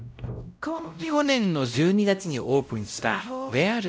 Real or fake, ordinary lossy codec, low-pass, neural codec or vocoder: fake; none; none; codec, 16 kHz, 0.5 kbps, X-Codec, WavLM features, trained on Multilingual LibriSpeech